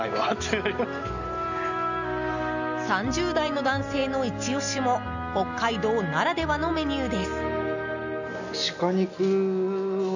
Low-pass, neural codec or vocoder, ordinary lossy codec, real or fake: 7.2 kHz; none; none; real